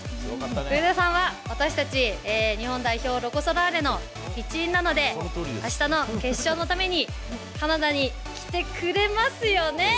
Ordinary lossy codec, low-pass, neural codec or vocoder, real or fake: none; none; none; real